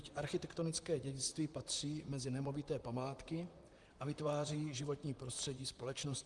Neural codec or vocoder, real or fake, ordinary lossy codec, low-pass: vocoder, 44.1 kHz, 128 mel bands, Pupu-Vocoder; fake; Opus, 32 kbps; 10.8 kHz